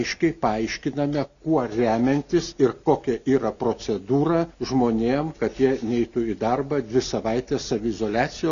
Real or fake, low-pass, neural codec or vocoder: real; 7.2 kHz; none